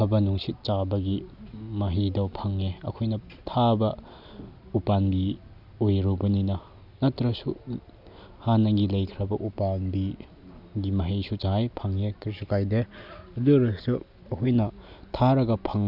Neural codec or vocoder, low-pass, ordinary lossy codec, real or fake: none; 5.4 kHz; none; real